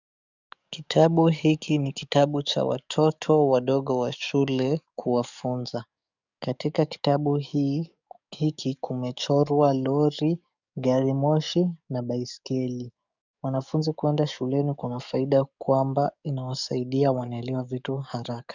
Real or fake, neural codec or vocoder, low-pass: fake; codec, 44.1 kHz, 7.8 kbps, DAC; 7.2 kHz